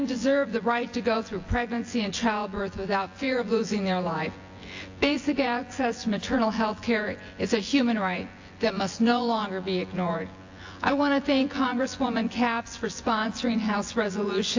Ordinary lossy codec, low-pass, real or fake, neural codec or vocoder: AAC, 48 kbps; 7.2 kHz; fake; vocoder, 24 kHz, 100 mel bands, Vocos